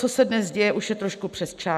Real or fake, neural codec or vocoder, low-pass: real; none; 14.4 kHz